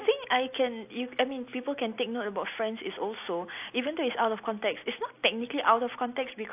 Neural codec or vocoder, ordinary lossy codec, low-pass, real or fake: none; AAC, 32 kbps; 3.6 kHz; real